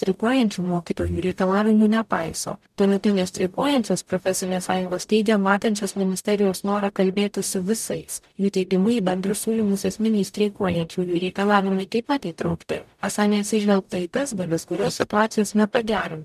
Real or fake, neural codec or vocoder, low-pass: fake; codec, 44.1 kHz, 0.9 kbps, DAC; 14.4 kHz